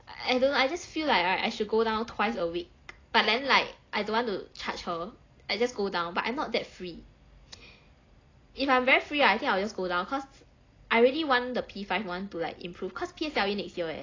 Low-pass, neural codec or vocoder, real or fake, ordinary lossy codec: 7.2 kHz; none; real; AAC, 32 kbps